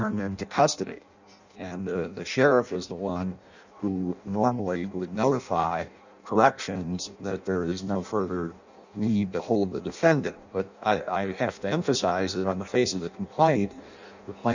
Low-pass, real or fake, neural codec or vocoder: 7.2 kHz; fake; codec, 16 kHz in and 24 kHz out, 0.6 kbps, FireRedTTS-2 codec